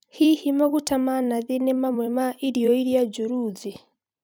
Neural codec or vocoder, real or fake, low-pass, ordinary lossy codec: vocoder, 44.1 kHz, 128 mel bands every 256 samples, BigVGAN v2; fake; none; none